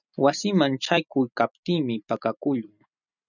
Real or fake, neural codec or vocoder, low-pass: real; none; 7.2 kHz